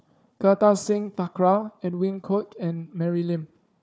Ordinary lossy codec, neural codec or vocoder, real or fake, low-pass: none; codec, 16 kHz, 4 kbps, FunCodec, trained on Chinese and English, 50 frames a second; fake; none